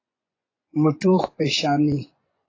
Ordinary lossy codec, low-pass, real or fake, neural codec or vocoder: AAC, 32 kbps; 7.2 kHz; fake; vocoder, 24 kHz, 100 mel bands, Vocos